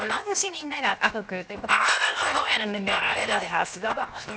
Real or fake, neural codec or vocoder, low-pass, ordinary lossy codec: fake; codec, 16 kHz, 0.7 kbps, FocalCodec; none; none